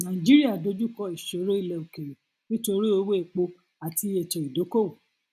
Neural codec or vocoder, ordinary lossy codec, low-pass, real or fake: none; none; 14.4 kHz; real